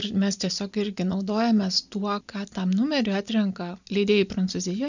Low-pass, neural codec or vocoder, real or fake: 7.2 kHz; none; real